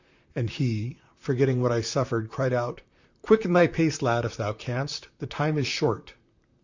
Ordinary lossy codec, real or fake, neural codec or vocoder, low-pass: Opus, 64 kbps; fake; vocoder, 44.1 kHz, 128 mel bands, Pupu-Vocoder; 7.2 kHz